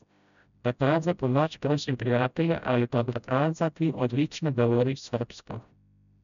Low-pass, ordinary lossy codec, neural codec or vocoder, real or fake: 7.2 kHz; none; codec, 16 kHz, 0.5 kbps, FreqCodec, smaller model; fake